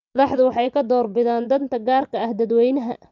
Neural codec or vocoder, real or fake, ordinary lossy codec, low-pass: vocoder, 24 kHz, 100 mel bands, Vocos; fake; none; 7.2 kHz